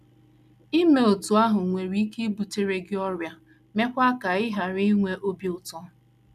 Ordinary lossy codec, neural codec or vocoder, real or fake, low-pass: none; none; real; 14.4 kHz